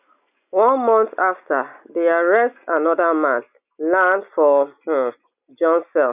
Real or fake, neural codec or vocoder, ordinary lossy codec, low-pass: real; none; none; 3.6 kHz